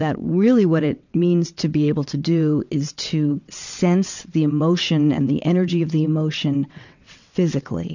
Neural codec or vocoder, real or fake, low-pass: vocoder, 22.05 kHz, 80 mel bands, WaveNeXt; fake; 7.2 kHz